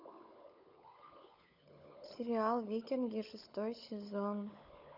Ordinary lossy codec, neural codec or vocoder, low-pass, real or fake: none; codec, 16 kHz, 16 kbps, FunCodec, trained on LibriTTS, 50 frames a second; 5.4 kHz; fake